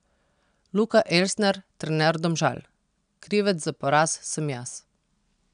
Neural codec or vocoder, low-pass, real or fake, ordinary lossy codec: none; 9.9 kHz; real; none